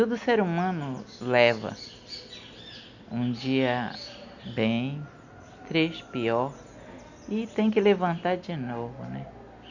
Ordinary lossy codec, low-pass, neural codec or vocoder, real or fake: none; 7.2 kHz; none; real